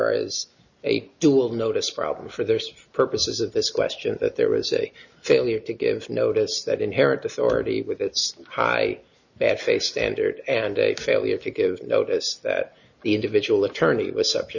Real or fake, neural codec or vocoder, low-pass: real; none; 7.2 kHz